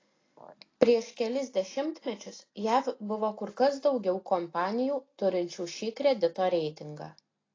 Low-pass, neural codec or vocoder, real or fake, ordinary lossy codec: 7.2 kHz; none; real; AAC, 32 kbps